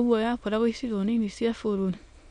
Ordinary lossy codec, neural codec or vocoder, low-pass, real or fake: none; autoencoder, 22.05 kHz, a latent of 192 numbers a frame, VITS, trained on many speakers; 9.9 kHz; fake